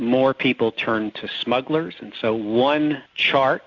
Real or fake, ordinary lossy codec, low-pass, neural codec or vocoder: real; AAC, 48 kbps; 7.2 kHz; none